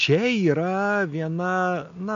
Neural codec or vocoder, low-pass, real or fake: none; 7.2 kHz; real